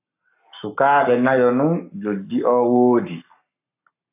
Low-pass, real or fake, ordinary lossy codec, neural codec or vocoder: 3.6 kHz; fake; AAC, 32 kbps; codec, 44.1 kHz, 7.8 kbps, Pupu-Codec